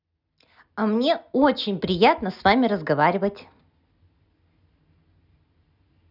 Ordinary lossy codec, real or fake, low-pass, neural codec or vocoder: none; real; 5.4 kHz; none